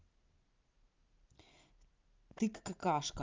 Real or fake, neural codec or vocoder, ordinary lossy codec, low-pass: real; none; Opus, 32 kbps; 7.2 kHz